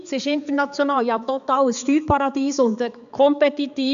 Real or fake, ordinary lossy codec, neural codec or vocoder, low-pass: fake; none; codec, 16 kHz, 4 kbps, X-Codec, HuBERT features, trained on balanced general audio; 7.2 kHz